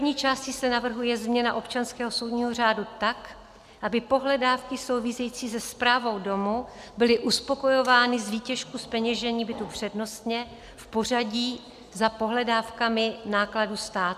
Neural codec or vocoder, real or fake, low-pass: none; real; 14.4 kHz